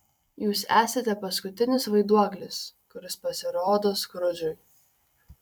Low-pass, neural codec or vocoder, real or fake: 19.8 kHz; vocoder, 44.1 kHz, 128 mel bands every 256 samples, BigVGAN v2; fake